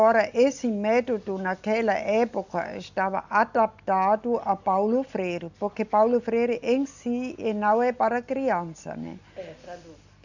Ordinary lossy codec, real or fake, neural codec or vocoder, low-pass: none; real; none; 7.2 kHz